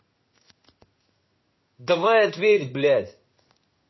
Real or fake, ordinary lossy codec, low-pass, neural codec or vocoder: fake; MP3, 24 kbps; 7.2 kHz; codec, 16 kHz in and 24 kHz out, 1 kbps, XY-Tokenizer